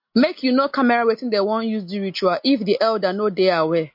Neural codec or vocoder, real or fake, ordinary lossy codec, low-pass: none; real; MP3, 32 kbps; 5.4 kHz